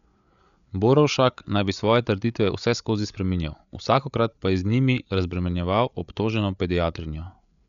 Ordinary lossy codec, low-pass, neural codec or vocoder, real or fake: none; 7.2 kHz; codec, 16 kHz, 8 kbps, FreqCodec, larger model; fake